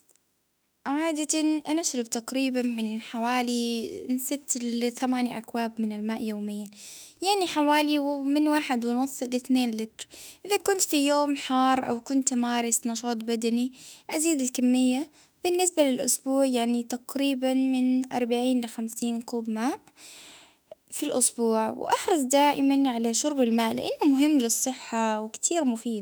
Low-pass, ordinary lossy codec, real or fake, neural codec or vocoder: none; none; fake; autoencoder, 48 kHz, 32 numbers a frame, DAC-VAE, trained on Japanese speech